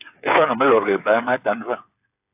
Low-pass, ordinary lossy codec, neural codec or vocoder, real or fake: 3.6 kHz; AAC, 32 kbps; codec, 16 kHz, 8 kbps, FreqCodec, smaller model; fake